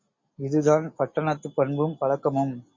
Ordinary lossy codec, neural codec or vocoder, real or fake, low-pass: MP3, 32 kbps; none; real; 7.2 kHz